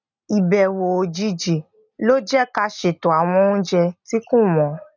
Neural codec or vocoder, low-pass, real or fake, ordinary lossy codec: none; 7.2 kHz; real; none